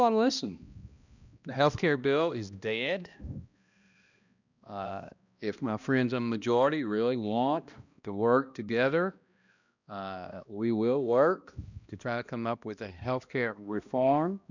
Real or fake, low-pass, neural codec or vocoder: fake; 7.2 kHz; codec, 16 kHz, 1 kbps, X-Codec, HuBERT features, trained on balanced general audio